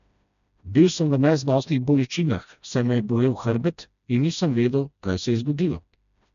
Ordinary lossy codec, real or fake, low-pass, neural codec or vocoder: none; fake; 7.2 kHz; codec, 16 kHz, 1 kbps, FreqCodec, smaller model